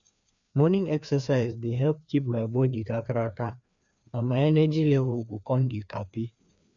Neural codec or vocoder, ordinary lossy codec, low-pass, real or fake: codec, 16 kHz, 2 kbps, FreqCodec, larger model; none; 7.2 kHz; fake